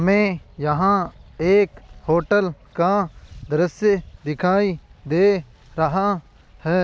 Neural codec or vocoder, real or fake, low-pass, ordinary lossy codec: none; real; none; none